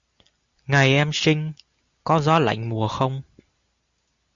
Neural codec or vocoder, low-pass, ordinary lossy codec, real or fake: none; 7.2 kHz; Opus, 64 kbps; real